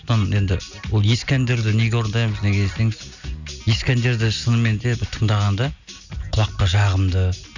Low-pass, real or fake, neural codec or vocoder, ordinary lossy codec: 7.2 kHz; real; none; none